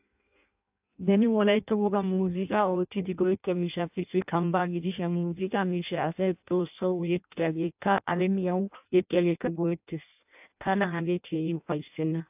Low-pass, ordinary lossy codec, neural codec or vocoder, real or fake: 3.6 kHz; AAC, 32 kbps; codec, 16 kHz in and 24 kHz out, 0.6 kbps, FireRedTTS-2 codec; fake